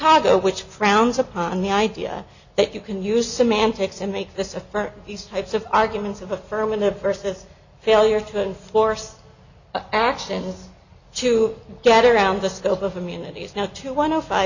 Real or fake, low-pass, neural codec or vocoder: real; 7.2 kHz; none